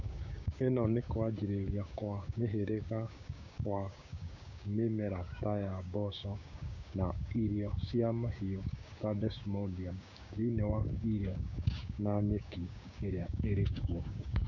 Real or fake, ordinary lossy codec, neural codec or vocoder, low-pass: fake; none; codec, 16 kHz, 6 kbps, DAC; 7.2 kHz